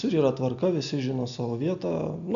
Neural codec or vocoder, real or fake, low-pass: none; real; 7.2 kHz